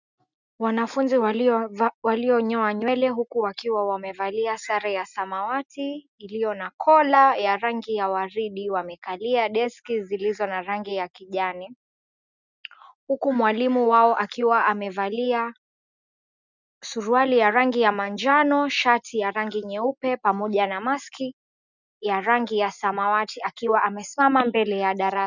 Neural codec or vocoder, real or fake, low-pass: none; real; 7.2 kHz